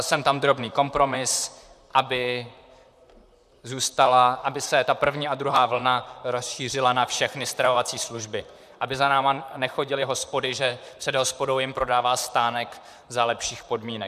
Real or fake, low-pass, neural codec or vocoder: fake; 14.4 kHz; vocoder, 44.1 kHz, 128 mel bands, Pupu-Vocoder